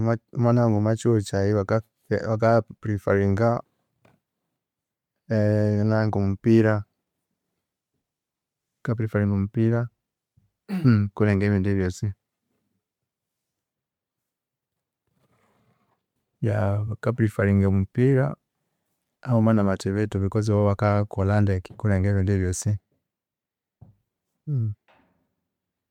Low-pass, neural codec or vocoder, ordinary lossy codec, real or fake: 19.8 kHz; vocoder, 44.1 kHz, 128 mel bands every 512 samples, BigVGAN v2; MP3, 96 kbps; fake